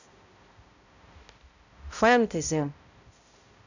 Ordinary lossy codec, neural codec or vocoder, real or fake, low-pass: none; codec, 16 kHz, 0.5 kbps, X-Codec, HuBERT features, trained on balanced general audio; fake; 7.2 kHz